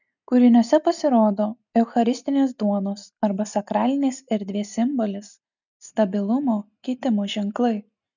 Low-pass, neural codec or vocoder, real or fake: 7.2 kHz; none; real